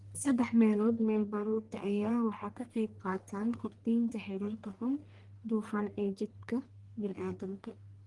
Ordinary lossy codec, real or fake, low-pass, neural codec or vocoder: Opus, 24 kbps; fake; 10.8 kHz; codec, 44.1 kHz, 1.7 kbps, Pupu-Codec